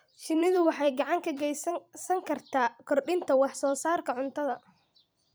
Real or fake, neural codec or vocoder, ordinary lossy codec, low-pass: fake; vocoder, 44.1 kHz, 128 mel bands every 256 samples, BigVGAN v2; none; none